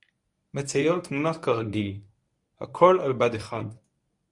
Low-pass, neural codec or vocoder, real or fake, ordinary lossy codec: 10.8 kHz; codec, 24 kHz, 0.9 kbps, WavTokenizer, medium speech release version 1; fake; Opus, 64 kbps